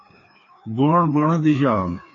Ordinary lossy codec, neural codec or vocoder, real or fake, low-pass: AAC, 32 kbps; codec, 16 kHz, 2 kbps, FreqCodec, larger model; fake; 7.2 kHz